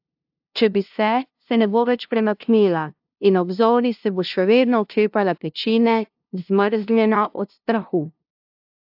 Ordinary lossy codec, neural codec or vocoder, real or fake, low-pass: AAC, 48 kbps; codec, 16 kHz, 0.5 kbps, FunCodec, trained on LibriTTS, 25 frames a second; fake; 5.4 kHz